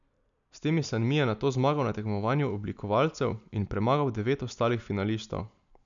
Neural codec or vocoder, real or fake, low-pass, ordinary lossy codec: none; real; 7.2 kHz; none